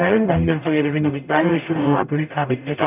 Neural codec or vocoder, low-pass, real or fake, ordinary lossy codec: codec, 44.1 kHz, 0.9 kbps, DAC; 3.6 kHz; fake; none